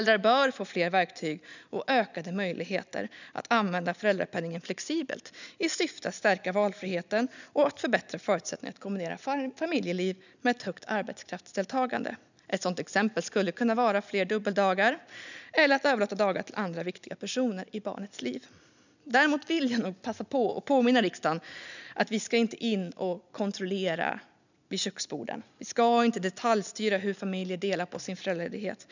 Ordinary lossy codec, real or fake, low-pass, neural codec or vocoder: none; real; 7.2 kHz; none